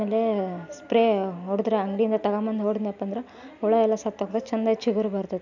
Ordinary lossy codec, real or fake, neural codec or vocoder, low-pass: none; real; none; 7.2 kHz